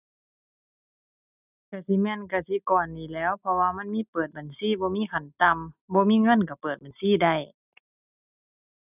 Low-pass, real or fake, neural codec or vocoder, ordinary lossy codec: 3.6 kHz; real; none; none